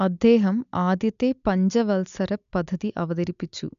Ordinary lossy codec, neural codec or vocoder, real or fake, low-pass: none; none; real; 7.2 kHz